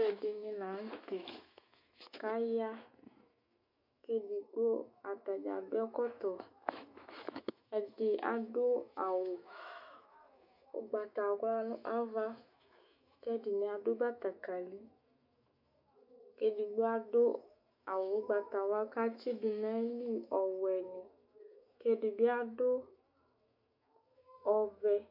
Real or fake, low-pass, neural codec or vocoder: fake; 5.4 kHz; autoencoder, 48 kHz, 128 numbers a frame, DAC-VAE, trained on Japanese speech